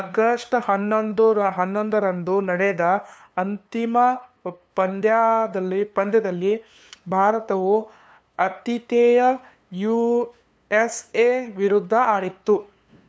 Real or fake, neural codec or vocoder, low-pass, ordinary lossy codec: fake; codec, 16 kHz, 2 kbps, FunCodec, trained on LibriTTS, 25 frames a second; none; none